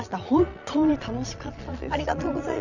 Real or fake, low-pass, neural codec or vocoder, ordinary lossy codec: fake; 7.2 kHz; vocoder, 22.05 kHz, 80 mel bands, Vocos; none